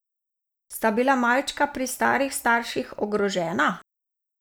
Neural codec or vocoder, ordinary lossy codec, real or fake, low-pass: none; none; real; none